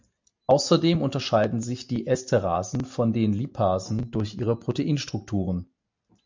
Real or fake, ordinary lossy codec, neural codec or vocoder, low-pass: real; MP3, 64 kbps; none; 7.2 kHz